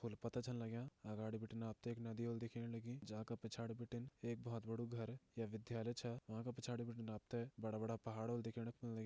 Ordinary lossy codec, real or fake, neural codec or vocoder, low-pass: none; real; none; none